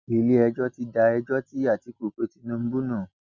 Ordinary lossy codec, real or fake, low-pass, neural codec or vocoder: none; real; 7.2 kHz; none